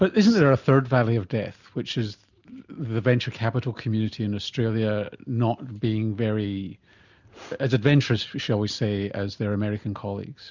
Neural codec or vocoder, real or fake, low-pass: none; real; 7.2 kHz